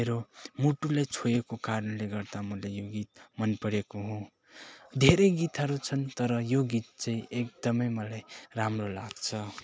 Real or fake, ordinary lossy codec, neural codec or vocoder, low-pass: real; none; none; none